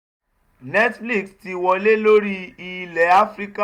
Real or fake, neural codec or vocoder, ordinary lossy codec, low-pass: real; none; Opus, 64 kbps; 19.8 kHz